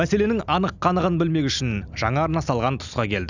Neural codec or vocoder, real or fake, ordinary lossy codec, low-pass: none; real; none; 7.2 kHz